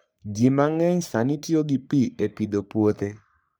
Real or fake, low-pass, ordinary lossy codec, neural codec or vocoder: fake; none; none; codec, 44.1 kHz, 3.4 kbps, Pupu-Codec